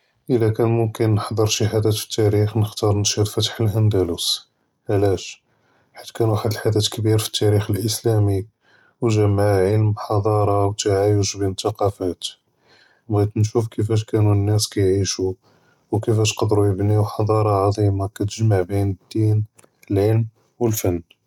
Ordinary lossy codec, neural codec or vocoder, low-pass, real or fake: none; none; 19.8 kHz; real